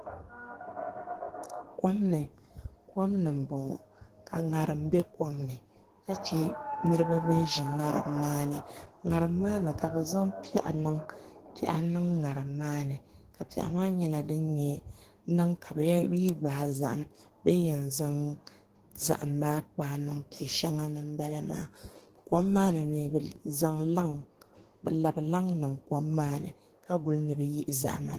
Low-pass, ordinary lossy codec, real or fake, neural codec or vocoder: 14.4 kHz; Opus, 16 kbps; fake; codec, 32 kHz, 1.9 kbps, SNAC